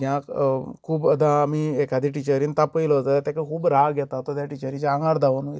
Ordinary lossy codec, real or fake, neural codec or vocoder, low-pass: none; real; none; none